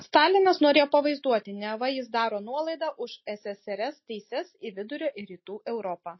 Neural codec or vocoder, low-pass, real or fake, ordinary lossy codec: none; 7.2 kHz; real; MP3, 24 kbps